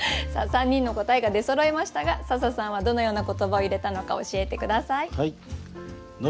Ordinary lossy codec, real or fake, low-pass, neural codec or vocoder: none; real; none; none